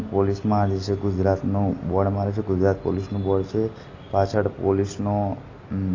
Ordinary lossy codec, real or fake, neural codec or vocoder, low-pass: AAC, 32 kbps; real; none; 7.2 kHz